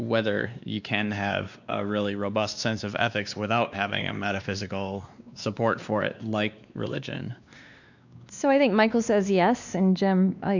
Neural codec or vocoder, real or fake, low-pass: codec, 16 kHz, 2 kbps, X-Codec, WavLM features, trained on Multilingual LibriSpeech; fake; 7.2 kHz